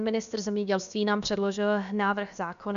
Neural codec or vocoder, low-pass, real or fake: codec, 16 kHz, about 1 kbps, DyCAST, with the encoder's durations; 7.2 kHz; fake